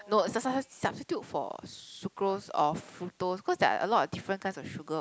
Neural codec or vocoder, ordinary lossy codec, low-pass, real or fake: none; none; none; real